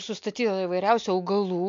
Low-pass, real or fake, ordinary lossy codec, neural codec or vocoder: 7.2 kHz; real; MP3, 48 kbps; none